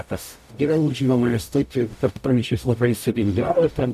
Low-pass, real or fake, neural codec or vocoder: 14.4 kHz; fake; codec, 44.1 kHz, 0.9 kbps, DAC